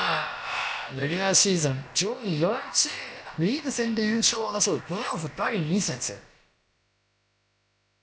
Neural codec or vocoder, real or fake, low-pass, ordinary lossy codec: codec, 16 kHz, about 1 kbps, DyCAST, with the encoder's durations; fake; none; none